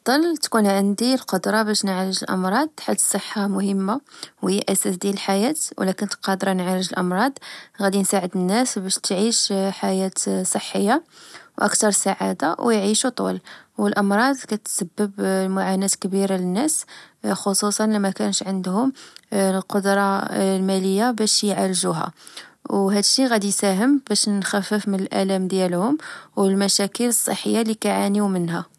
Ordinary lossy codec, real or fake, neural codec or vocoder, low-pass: none; real; none; none